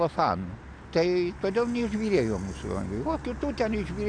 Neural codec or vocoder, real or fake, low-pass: none; real; 9.9 kHz